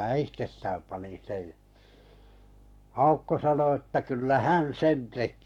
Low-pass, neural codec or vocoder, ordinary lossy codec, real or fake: 19.8 kHz; codec, 44.1 kHz, 7.8 kbps, Pupu-Codec; none; fake